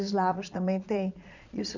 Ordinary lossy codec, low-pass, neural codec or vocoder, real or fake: none; 7.2 kHz; vocoder, 22.05 kHz, 80 mel bands, Vocos; fake